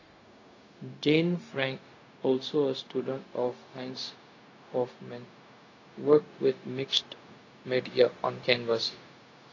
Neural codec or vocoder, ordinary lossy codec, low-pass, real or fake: codec, 16 kHz, 0.4 kbps, LongCat-Audio-Codec; AAC, 32 kbps; 7.2 kHz; fake